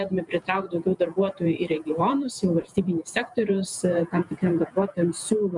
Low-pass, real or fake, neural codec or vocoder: 10.8 kHz; fake; vocoder, 48 kHz, 128 mel bands, Vocos